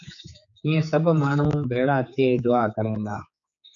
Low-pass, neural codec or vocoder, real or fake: 7.2 kHz; codec, 16 kHz, 4 kbps, X-Codec, HuBERT features, trained on general audio; fake